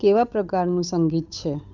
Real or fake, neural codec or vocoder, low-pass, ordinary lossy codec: fake; codec, 16 kHz, 8 kbps, FunCodec, trained on Chinese and English, 25 frames a second; 7.2 kHz; none